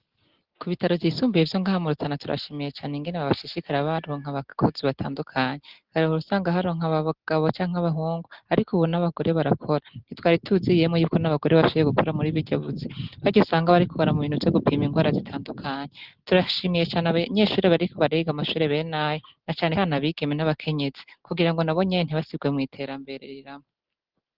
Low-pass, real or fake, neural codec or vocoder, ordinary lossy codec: 5.4 kHz; real; none; Opus, 16 kbps